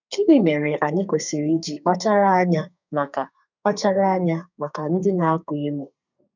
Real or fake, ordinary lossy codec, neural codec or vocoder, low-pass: fake; none; codec, 32 kHz, 1.9 kbps, SNAC; 7.2 kHz